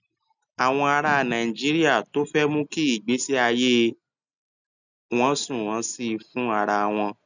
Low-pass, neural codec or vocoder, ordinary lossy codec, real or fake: 7.2 kHz; none; none; real